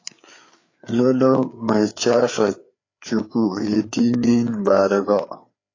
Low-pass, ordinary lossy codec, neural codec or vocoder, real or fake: 7.2 kHz; AAC, 32 kbps; codec, 16 kHz, 4 kbps, FreqCodec, larger model; fake